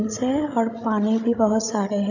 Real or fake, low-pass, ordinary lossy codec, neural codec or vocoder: fake; 7.2 kHz; none; codec, 16 kHz, 16 kbps, FreqCodec, larger model